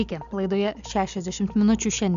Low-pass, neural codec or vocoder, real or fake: 7.2 kHz; none; real